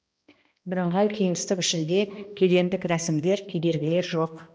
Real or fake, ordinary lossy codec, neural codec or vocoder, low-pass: fake; none; codec, 16 kHz, 1 kbps, X-Codec, HuBERT features, trained on balanced general audio; none